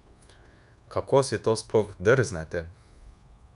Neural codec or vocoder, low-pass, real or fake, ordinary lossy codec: codec, 24 kHz, 1.2 kbps, DualCodec; 10.8 kHz; fake; none